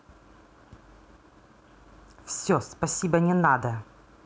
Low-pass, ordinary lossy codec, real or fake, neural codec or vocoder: none; none; real; none